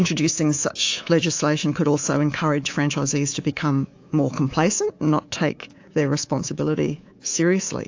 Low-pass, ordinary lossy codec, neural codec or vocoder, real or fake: 7.2 kHz; AAC, 48 kbps; codec, 24 kHz, 3.1 kbps, DualCodec; fake